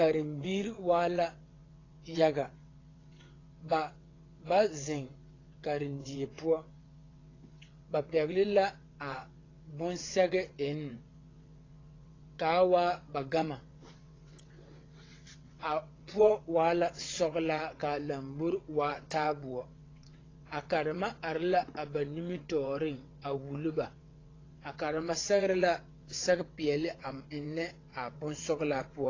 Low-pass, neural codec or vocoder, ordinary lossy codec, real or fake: 7.2 kHz; vocoder, 22.05 kHz, 80 mel bands, WaveNeXt; AAC, 32 kbps; fake